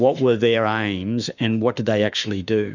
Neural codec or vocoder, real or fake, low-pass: autoencoder, 48 kHz, 32 numbers a frame, DAC-VAE, trained on Japanese speech; fake; 7.2 kHz